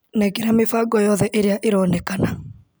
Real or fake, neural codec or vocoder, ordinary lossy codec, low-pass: real; none; none; none